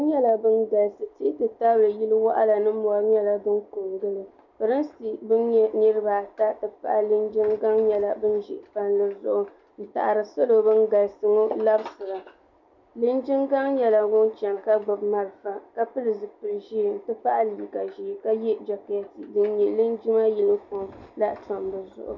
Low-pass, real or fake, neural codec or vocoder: 7.2 kHz; real; none